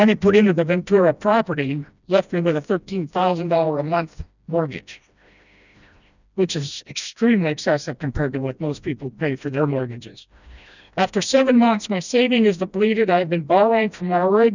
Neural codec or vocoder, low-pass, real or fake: codec, 16 kHz, 1 kbps, FreqCodec, smaller model; 7.2 kHz; fake